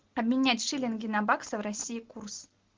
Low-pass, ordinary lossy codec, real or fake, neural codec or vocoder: 7.2 kHz; Opus, 16 kbps; real; none